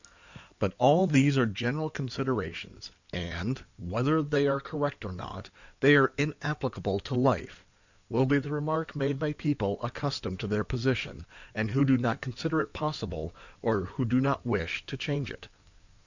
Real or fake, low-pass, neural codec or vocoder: fake; 7.2 kHz; codec, 16 kHz in and 24 kHz out, 2.2 kbps, FireRedTTS-2 codec